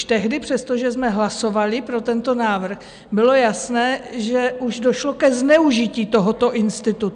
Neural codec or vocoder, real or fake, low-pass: none; real; 9.9 kHz